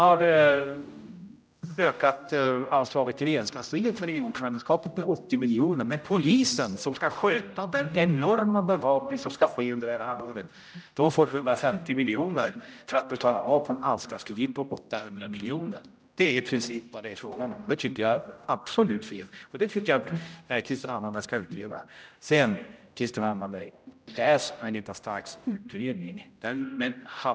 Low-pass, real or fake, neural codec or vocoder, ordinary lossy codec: none; fake; codec, 16 kHz, 0.5 kbps, X-Codec, HuBERT features, trained on general audio; none